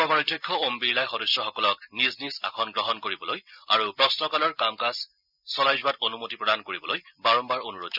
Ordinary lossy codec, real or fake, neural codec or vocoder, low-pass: none; real; none; 5.4 kHz